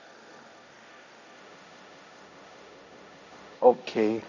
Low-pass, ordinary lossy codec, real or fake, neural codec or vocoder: 7.2 kHz; none; fake; codec, 16 kHz, 1.1 kbps, Voila-Tokenizer